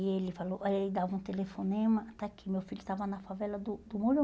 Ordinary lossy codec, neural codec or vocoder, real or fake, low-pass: none; none; real; none